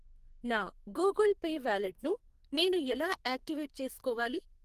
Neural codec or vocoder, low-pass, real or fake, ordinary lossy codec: codec, 44.1 kHz, 2.6 kbps, SNAC; 14.4 kHz; fake; Opus, 24 kbps